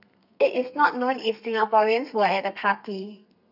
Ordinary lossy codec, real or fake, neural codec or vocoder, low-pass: none; fake; codec, 32 kHz, 1.9 kbps, SNAC; 5.4 kHz